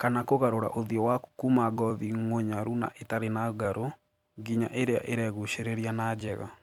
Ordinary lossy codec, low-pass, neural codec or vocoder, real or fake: MP3, 96 kbps; 19.8 kHz; vocoder, 44.1 kHz, 128 mel bands every 256 samples, BigVGAN v2; fake